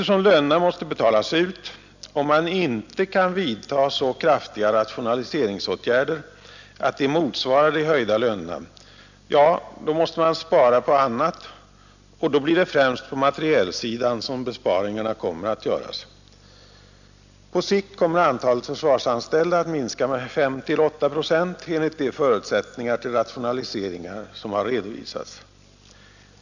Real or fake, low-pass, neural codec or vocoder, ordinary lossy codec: real; 7.2 kHz; none; none